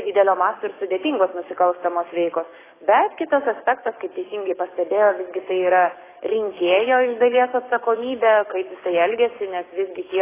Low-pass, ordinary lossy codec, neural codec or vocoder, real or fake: 3.6 kHz; AAC, 16 kbps; codec, 44.1 kHz, 7.8 kbps, DAC; fake